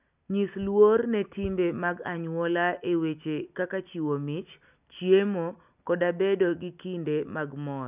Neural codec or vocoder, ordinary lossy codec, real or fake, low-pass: none; none; real; 3.6 kHz